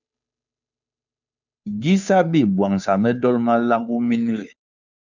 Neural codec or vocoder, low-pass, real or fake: codec, 16 kHz, 2 kbps, FunCodec, trained on Chinese and English, 25 frames a second; 7.2 kHz; fake